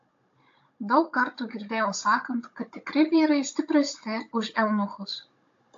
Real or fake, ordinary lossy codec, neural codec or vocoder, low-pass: fake; AAC, 48 kbps; codec, 16 kHz, 16 kbps, FunCodec, trained on Chinese and English, 50 frames a second; 7.2 kHz